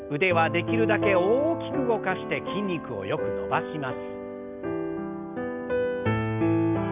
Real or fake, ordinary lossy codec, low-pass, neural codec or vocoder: real; none; 3.6 kHz; none